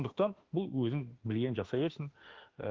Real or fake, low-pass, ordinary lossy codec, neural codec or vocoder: fake; 7.2 kHz; Opus, 16 kbps; autoencoder, 48 kHz, 32 numbers a frame, DAC-VAE, trained on Japanese speech